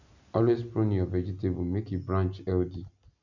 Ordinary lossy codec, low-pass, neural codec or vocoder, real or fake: none; 7.2 kHz; none; real